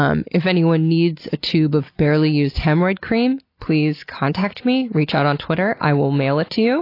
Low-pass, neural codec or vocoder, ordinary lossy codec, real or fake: 5.4 kHz; none; AAC, 32 kbps; real